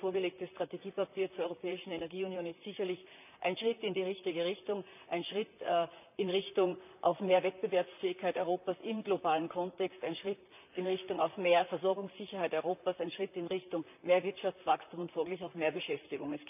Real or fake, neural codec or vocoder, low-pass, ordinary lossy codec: fake; vocoder, 44.1 kHz, 128 mel bands, Pupu-Vocoder; 3.6 kHz; none